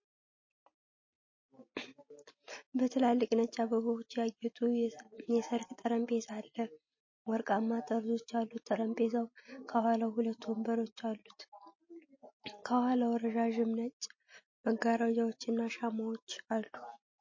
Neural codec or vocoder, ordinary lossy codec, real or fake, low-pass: none; MP3, 32 kbps; real; 7.2 kHz